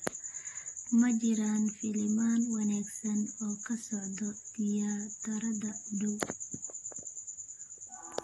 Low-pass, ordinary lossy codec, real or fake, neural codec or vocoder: 19.8 kHz; AAC, 32 kbps; real; none